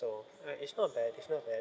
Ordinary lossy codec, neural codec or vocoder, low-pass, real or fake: none; none; none; real